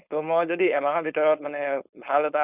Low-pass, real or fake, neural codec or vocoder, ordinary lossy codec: 3.6 kHz; fake; codec, 16 kHz, 4.8 kbps, FACodec; Opus, 64 kbps